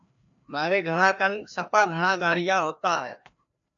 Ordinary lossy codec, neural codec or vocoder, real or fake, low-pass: MP3, 96 kbps; codec, 16 kHz, 1 kbps, FreqCodec, larger model; fake; 7.2 kHz